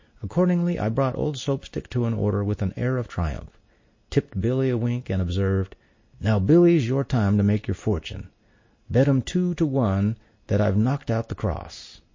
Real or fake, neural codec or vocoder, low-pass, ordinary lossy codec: real; none; 7.2 kHz; MP3, 32 kbps